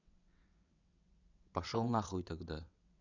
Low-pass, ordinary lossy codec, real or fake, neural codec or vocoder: 7.2 kHz; none; fake; vocoder, 22.05 kHz, 80 mel bands, WaveNeXt